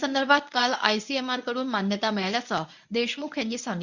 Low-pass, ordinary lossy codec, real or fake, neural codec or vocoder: 7.2 kHz; none; fake; codec, 24 kHz, 0.9 kbps, WavTokenizer, medium speech release version 1